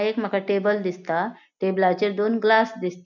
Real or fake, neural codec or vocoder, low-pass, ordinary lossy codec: real; none; 7.2 kHz; none